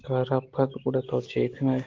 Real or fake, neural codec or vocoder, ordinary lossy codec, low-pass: real; none; Opus, 16 kbps; 7.2 kHz